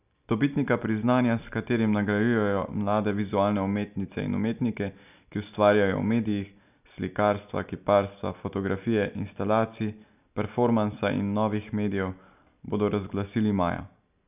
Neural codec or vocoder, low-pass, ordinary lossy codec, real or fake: none; 3.6 kHz; none; real